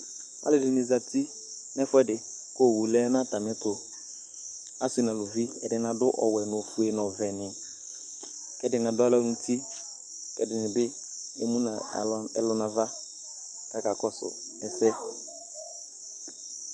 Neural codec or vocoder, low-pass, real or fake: codec, 44.1 kHz, 7.8 kbps, DAC; 9.9 kHz; fake